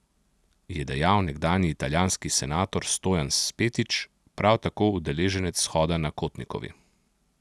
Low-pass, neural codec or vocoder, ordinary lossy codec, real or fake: none; none; none; real